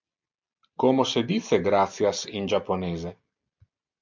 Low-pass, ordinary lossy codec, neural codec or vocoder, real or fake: 7.2 kHz; MP3, 64 kbps; none; real